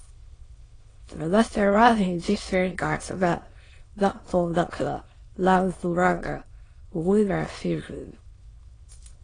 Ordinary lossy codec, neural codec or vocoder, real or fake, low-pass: AAC, 32 kbps; autoencoder, 22.05 kHz, a latent of 192 numbers a frame, VITS, trained on many speakers; fake; 9.9 kHz